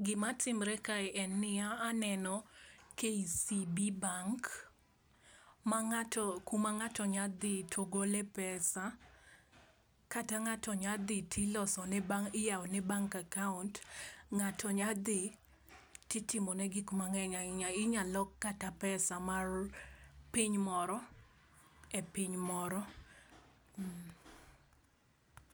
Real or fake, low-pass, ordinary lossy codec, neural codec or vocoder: real; none; none; none